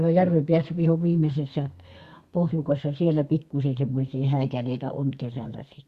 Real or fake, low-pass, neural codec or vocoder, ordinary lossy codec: fake; 14.4 kHz; codec, 32 kHz, 1.9 kbps, SNAC; Opus, 64 kbps